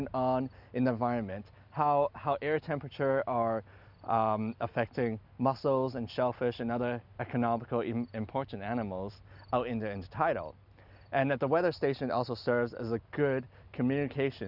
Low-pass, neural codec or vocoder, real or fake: 5.4 kHz; none; real